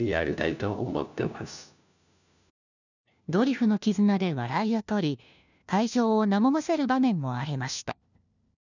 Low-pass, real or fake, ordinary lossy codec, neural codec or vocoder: 7.2 kHz; fake; none; codec, 16 kHz, 1 kbps, FunCodec, trained on LibriTTS, 50 frames a second